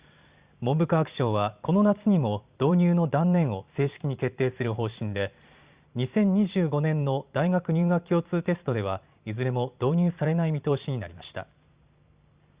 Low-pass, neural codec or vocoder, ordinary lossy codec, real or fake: 3.6 kHz; vocoder, 44.1 kHz, 128 mel bands every 512 samples, BigVGAN v2; Opus, 64 kbps; fake